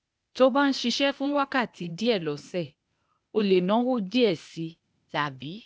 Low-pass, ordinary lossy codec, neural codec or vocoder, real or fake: none; none; codec, 16 kHz, 0.8 kbps, ZipCodec; fake